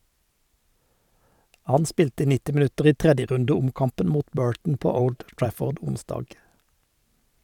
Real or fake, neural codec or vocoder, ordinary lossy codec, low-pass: fake; vocoder, 44.1 kHz, 128 mel bands every 512 samples, BigVGAN v2; none; 19.8 kHz